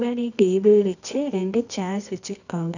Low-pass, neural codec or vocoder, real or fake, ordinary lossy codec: 7.2 kHz; codec, 24 kHz, 0.9 kbps, WavTokenizer, medium music audio release; fake; none